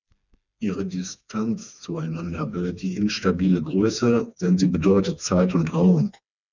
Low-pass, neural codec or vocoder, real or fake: 7.2 kHz; codec, 16 kHz, 2 kbps, FreqCodec, smaller model; fake